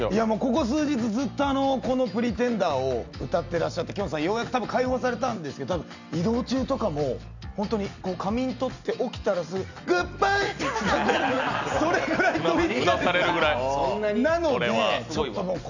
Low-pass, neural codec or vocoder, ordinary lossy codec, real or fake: 7.2 kHz; none; none; real